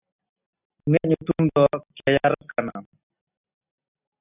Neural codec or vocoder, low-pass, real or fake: none; 3.6 kHz; real